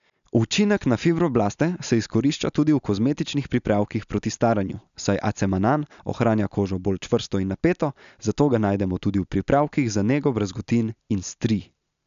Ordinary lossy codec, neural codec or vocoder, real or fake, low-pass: MP3, 96 kbps; none; real; 7.2 kHz